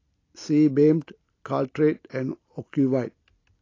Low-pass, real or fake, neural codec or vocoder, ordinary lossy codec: 7.2 kHz; real; none; AAC, 32 kbps